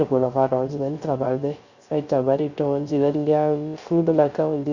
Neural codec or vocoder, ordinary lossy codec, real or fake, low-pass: codec, 16 kHz, 0.3 kbps, FocalCodec; Opus, 64 kbps; fake; 7.2 kHz